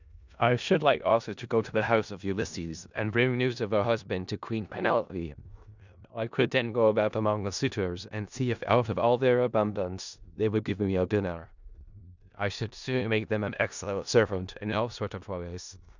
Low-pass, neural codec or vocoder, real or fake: 7.2 kHz; codec, 16 kHz in and 24 kHz out, 0.4 kbps, LongCat-Audio-Codec, four codebook decoder; fake